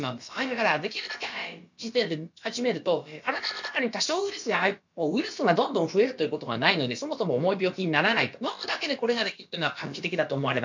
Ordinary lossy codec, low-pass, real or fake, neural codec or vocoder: MP3, 48 kbps; 7.2 kHz; fake; codec, 16 kHz, about 1 kbps, DyCAST, with the encoder's durations